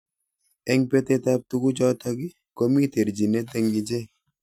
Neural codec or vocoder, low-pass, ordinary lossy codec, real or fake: none; 19.8 kHz; none; real